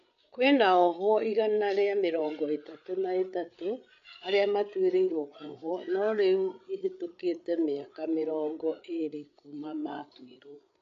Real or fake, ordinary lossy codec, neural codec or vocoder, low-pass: fake; AAC, 64 kbps; codec, 16 kHz, 8 kbps, FreqCodec, larger model; 7.2 kHz